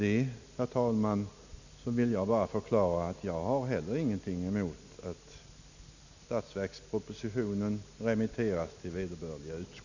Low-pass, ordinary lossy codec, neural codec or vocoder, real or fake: 7.2 kHz; none; none; real